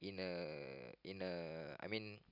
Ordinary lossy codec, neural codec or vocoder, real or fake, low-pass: none; vocoder, 44.1 kHz, 128 mel bands every 256 samples, BigVGAN v2; fake; 5.4 kHz